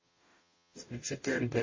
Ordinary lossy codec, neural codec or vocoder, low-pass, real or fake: MP3, 32 kbps; codec, 44.1 kHz, 0.9 kbps, DAC; 7.2 kHz; fake